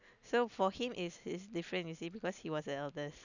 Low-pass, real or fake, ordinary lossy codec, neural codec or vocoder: 7.2 kHz; real; none; none